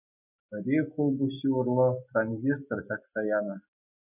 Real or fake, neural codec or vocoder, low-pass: real; none; 3.6 kHz